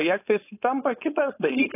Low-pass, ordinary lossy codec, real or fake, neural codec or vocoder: 3.6 kHz; AAC, 16 kbps; fake; codec, 16 kHz, 4.8 kbps, FACodec